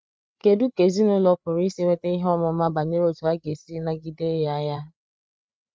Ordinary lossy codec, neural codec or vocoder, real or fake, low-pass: none; codec, 16 kHz, 8 kbps, FreqCodec, larger model; fake; none